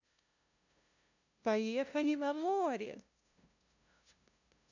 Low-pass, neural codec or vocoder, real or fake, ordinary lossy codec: 7.2 kHz; codec, 16 kHz, 0.5 kbps, FunCodec, trained on LibriTTS, 25 frames a second; fake; none